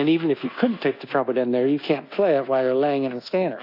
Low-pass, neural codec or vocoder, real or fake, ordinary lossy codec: 5.4 kHz; codec, 24 kHz, 1.2 kbps, DualCodec; fake; AAC, 32 kbps